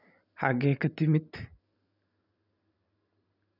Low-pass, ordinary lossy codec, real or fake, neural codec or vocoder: 5.4 kHz; none; real; none